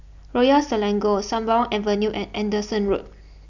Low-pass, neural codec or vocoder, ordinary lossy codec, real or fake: 7.2 kHz; none; none; real